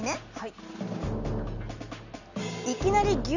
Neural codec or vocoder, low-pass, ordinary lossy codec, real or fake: none; 7.2 kHz; none; real